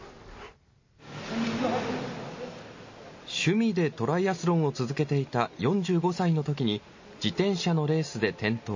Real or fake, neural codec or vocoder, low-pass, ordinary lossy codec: real; none; 7.2 kHz; MP3, 32 kbps